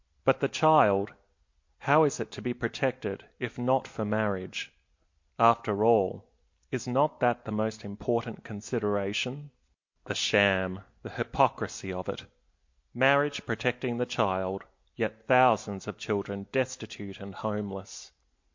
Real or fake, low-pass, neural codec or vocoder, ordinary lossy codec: real; 7.2 kHz; none; MP3, 48 kbps